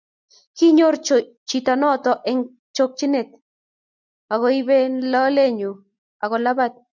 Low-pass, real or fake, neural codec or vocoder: 7.2 kHz; real; none